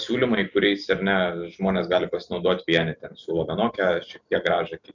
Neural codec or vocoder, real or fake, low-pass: none; real; 7.2 kHz